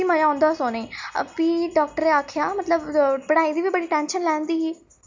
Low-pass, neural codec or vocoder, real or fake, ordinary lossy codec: 7.2 kHz; none; real; MP3, 48 kbps